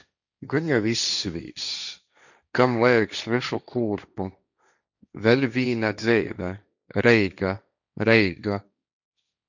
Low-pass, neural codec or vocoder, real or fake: 7.2 kHz; codec, 16 kHz, 1.1 kbps, Voila-Tokenizer; fake